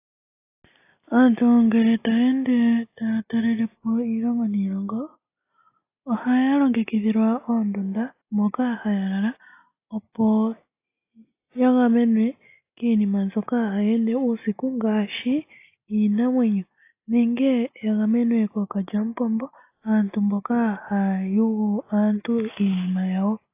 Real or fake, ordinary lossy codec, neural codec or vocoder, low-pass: real; AAC, 24 kbps; none; 3.6 kHz